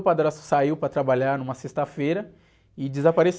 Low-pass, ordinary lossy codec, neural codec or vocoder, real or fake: none; none; none; real